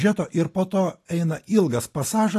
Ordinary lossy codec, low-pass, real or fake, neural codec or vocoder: AAC, 48 kbps; 14.4 kHz; real; none